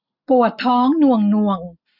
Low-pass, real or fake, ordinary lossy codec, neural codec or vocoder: 5.4 kHz; real; none; none